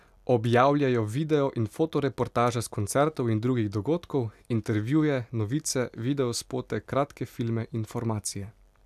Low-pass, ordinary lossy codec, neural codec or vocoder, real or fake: 14.4 kHz; none; none; real